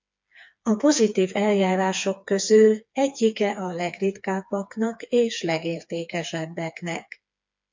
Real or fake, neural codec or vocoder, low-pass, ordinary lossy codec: fake; codec, 16 kHz, 4 kbps, FreqCodec, smaller model; 7.2 kHz; MP3, 64 kbps